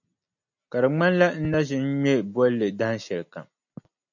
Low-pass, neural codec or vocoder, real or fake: 7.2 kHz; none; real